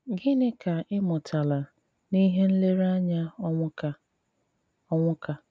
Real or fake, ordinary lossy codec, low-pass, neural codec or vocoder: real; none; none; none